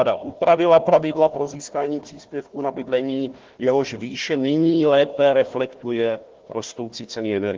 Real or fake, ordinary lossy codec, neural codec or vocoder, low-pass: fake; Opus, 16 kbps; codec, 16 kHz, 1 kbps, FunCodec, trained on Chinese and English, 50 frames a second; 7.2 kHz